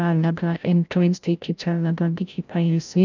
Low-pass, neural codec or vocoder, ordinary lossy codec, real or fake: 7.2 kHz; codec, 16 kHz, 0.5 kbps, FreqCodec, larger model; none; fake